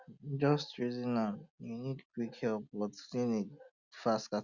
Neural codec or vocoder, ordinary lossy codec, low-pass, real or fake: none; Opus, 64 kbps; 7.2 kHz; real